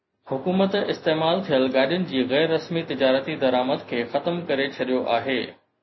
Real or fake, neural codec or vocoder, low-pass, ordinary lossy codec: real; none; 7.2 kHz; MP3, 24 kbps